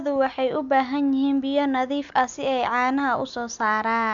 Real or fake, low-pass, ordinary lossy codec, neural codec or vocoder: real; 7.2 kHz; none; none